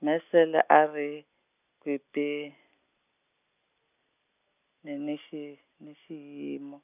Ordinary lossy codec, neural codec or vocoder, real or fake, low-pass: none; none; real; 3.6 kHz